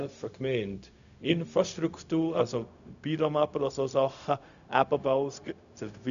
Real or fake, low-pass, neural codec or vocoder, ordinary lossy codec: fake; 7.2 kHz; codec, 16 kHz, 0.4 kbps, LongCat-Audio-Codec; none